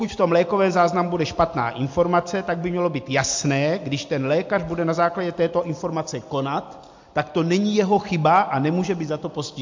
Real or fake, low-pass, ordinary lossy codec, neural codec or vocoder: real; 7.2 kHz; MP3, 48 kbps; none